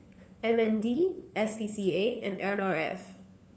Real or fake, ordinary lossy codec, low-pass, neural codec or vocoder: fake; none; none; codec, 16 kHz, 4 kbps, FunCodec, trained on LibriTTS, 50 frames a second